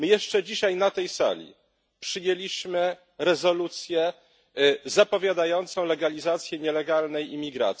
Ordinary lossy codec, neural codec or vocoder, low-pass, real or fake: none; none; none; real